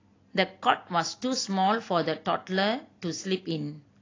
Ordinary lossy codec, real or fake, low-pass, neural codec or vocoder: AAC, 32 kbps; real; 7.2 kHz; none